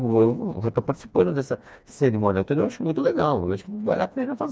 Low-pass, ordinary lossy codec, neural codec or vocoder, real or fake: none; none; codec, 16 kHz, 2 kbps, FreqCodec, smaller model; fake